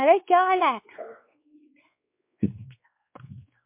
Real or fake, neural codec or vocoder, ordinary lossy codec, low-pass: fake; codec, 16 kHz, 2 kbps, X-Codec, WavLM features, trained on Multilingual LibriSpeech; MP3, 32 kbps; 3.6 kHz